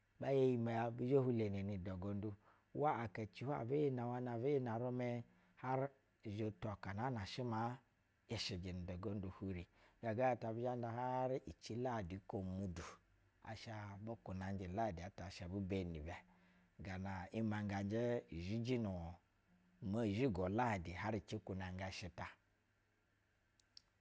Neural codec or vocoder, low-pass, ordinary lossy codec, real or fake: none; none; none; real